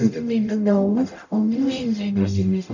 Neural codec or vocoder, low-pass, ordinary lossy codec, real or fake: codec, 44.1 kHz, 0.9 kbps, DAC; 7.2 kHz; none; fake